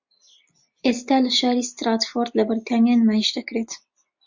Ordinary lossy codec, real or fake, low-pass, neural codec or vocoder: MP3, 48 kbps; real; 7.2 kHz; none